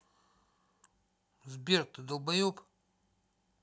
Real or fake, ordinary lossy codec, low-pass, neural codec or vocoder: real; none; none; none